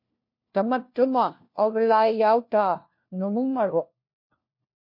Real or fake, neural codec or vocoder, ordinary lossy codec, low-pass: fake; codec, 16 kHz, 1 kbps, FunCodec, trained on LibriTTS, 50 frames a second; MP3, 32 kbps; 5.4 kHz